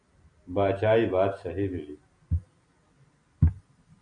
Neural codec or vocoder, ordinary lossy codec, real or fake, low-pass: none; MP3, 96 kbps; real; 9.9 kHz